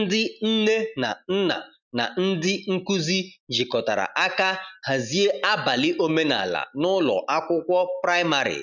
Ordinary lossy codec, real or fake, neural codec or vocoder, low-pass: none; real; none; 7.2 kHz